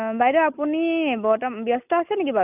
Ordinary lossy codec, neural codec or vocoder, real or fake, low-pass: none; none; real; 3.6 kHz